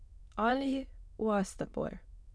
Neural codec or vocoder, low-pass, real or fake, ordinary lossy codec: autoencoder, 22.05 kHz, a latent of 192 numbers a frame, VITS, trained on many speakers; none; fake; none